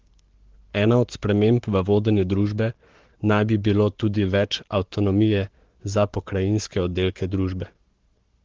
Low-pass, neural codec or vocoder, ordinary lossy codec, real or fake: 7.2 kHz; codec, 44.1 kHz, 7.8 kbps, Pupu-Codec; Opus, 16 kbps; fake